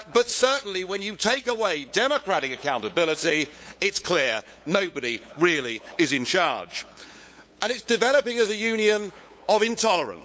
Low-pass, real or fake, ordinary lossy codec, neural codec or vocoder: none; fake; none; codec, 16 kHz, 8 kbps, FunCodec, trained on LibriTTS, 25 frames a second